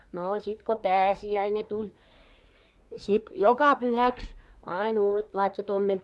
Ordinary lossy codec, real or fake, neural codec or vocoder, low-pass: none; fake; codec, 24 kHz, 1 kbps, SNAC; none